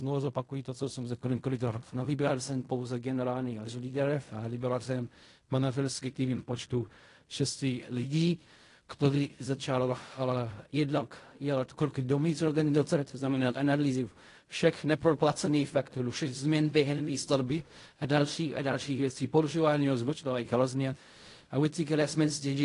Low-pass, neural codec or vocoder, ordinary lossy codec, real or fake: 10.8 kHz; codec, 16 kHz in and 24 kHz out, 0.4 kbps, LongCat-Audio-Codec, fine tuned four codebook decoder; AAC, 48 kbps; fake